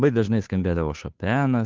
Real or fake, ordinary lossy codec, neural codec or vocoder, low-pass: fake; Opus, 32 kbps; codec, 16 kHz, 2 kbps, FunCodec, trained on Chinese and English, 25 frames a second; 7.2 kHz